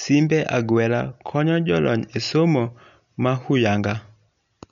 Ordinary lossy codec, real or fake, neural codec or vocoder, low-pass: none; real; none; 7.2 kHz